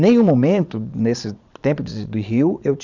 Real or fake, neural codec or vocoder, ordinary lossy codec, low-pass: fake; vocoder, 22.05 kHz, 80 mel bands, Vocos; none; 7.2 kHz